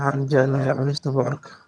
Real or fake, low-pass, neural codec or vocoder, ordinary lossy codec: fake; none; vocoder, 22.05 kHz, 80 mel bands, HiFi-GAN; none